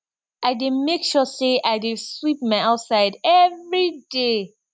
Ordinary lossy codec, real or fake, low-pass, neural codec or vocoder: none; real; none; none